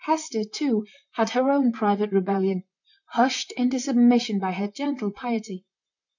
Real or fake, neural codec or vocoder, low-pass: fake; vocoder, 44.1 kHz, 128 mel bands, Pupu-Vocoder; 7.2 kHz